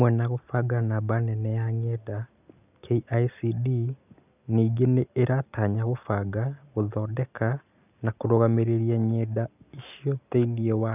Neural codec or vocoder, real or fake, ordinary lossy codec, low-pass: none; real; none; 3.6 kHz